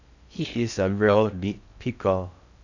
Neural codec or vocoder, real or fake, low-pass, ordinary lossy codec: codec, 16 kHz in and 24 kHz out, 0.6 kbps, FocalCodec, streaming, 4096 codes; fake; 7.2 kHz; none